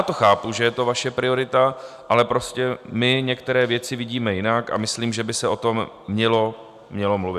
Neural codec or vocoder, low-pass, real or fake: none; 14.4 kHz; real